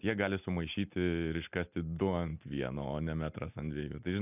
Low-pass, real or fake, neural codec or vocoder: 3.6 kHz; real; none